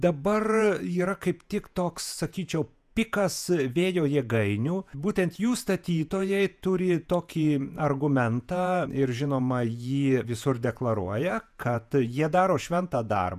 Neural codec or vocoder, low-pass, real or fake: vocoder, 48 kHz, 128 mel bands, Vocos; 14.4 kHz; fake